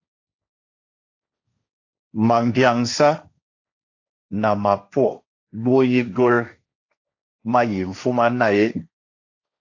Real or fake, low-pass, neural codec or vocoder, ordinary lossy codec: fake; 7.2 kHz; codec, 16 kHz, 1.1 kbps, Voila-Tokenizer; AAC, 48 kbps